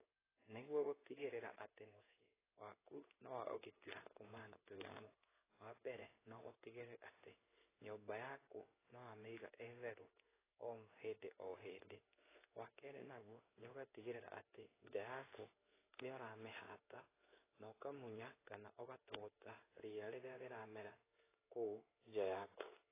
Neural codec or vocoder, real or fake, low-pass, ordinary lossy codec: codec, 16 kHz in and 24 kHz out, 1 kbps, XY-Tokenizer; fake; 3.6 kHz; AAC, 16 kbps